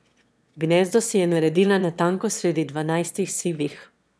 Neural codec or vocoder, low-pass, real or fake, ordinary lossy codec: autoencoder, 22.05 kHz, a latent of 192 numbers a frame, VITS, trained on one speaker; none; fake; none